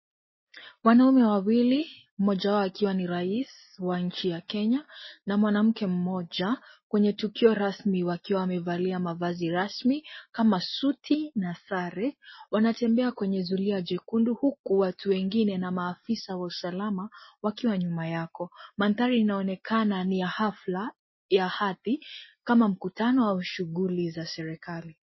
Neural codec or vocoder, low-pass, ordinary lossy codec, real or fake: none; 7.2 kHz; MP3, 24 kbps; real